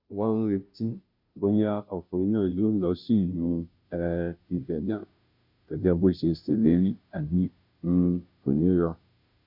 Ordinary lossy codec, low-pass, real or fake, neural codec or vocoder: none; 5.4 kHz; fake; codec, 16 kHz, 0.5 kbps, FunCodec, trained on Chinese and English, 25 frames a second